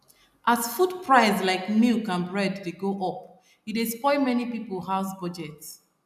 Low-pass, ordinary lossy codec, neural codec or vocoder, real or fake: 14.4 kHz; none; none; real